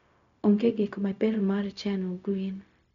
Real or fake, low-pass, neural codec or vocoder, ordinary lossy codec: fake; 7.2 kHz; codec, 16 kHz, 0.4 kbps, LongCat-Audio-Codec; none